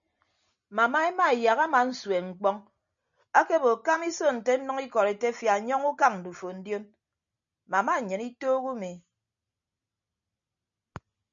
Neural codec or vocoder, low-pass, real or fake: none; 7.2 kHz; real